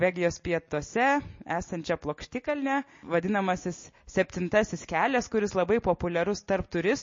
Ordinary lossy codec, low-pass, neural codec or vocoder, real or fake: MP3, 32 kbps; 7.2 kHz; none; real